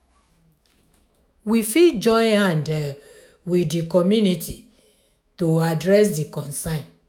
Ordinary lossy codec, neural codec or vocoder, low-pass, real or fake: none; autoencoder, 48 kHz, 128 numbers a frame, DAC-VAE, trained on Japanese speech; 19.8 kHz; fake